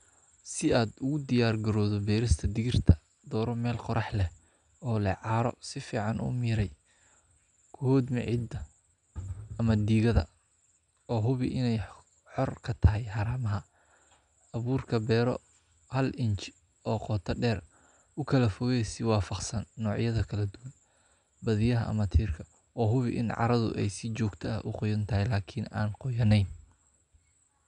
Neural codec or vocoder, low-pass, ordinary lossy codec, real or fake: none; 9.9 kHz; none; real